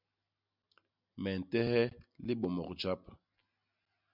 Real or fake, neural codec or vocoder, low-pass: real; none; 5.4 kHz